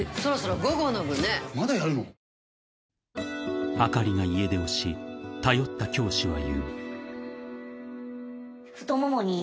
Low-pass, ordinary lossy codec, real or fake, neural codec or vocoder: none; none; real; none